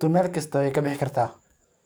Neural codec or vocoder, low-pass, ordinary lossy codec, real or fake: codec, 44.1 kHz, 7.8 kbps, DAC; none; none; fake